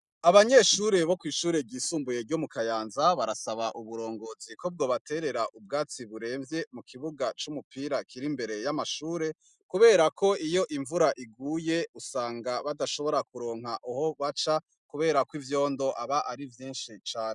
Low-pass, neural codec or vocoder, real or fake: 10.8 kHz; none; real